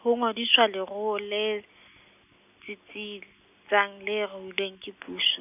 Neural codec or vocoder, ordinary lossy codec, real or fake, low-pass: none; none; real; 3.6 kHz